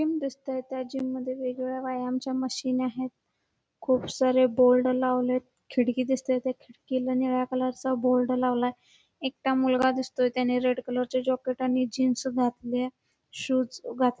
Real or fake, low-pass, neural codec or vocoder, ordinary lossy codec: real; none; none; none